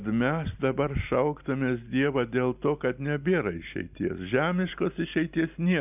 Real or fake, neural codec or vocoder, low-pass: real; none; 3.6 kHz